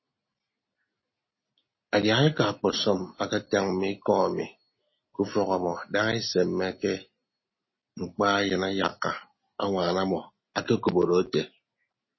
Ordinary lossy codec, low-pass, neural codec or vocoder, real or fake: MP3, 24 kbps; 7.2 kHz; none; real